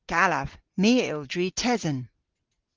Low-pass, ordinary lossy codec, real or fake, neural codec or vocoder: 7.2 kHz; Opus, 16 kbps; real; none